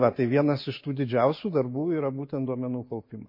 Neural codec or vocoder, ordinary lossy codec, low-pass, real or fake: none; MP3, 24 kbps; 5.4 kHz; real